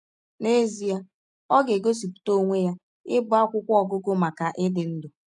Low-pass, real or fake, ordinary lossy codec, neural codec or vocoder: 10.8 kHz; real; none; none